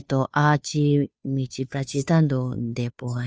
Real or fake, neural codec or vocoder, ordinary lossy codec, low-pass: fake; codec, 16 kHz, 2 kbps, FunCodec, trained on Chinese and English, 25 frames a second; none; none